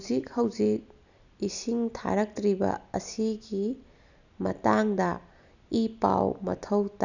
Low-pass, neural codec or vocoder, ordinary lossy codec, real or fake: 7.2 kHz; none; none; real